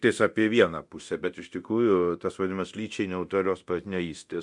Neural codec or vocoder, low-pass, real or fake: codec, 24 kHz, 0.9 kbps, DualCodec; 10.8 kHz; fake